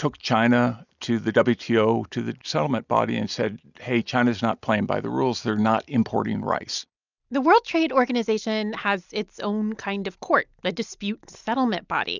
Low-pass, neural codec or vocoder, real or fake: 7.2 kHz; none; real